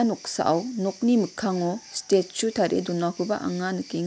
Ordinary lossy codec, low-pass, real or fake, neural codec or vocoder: none; none; real; none